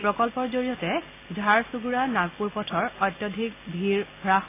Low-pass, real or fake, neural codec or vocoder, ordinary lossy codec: 3.6 kHz; real; none; AAC, 24 kbps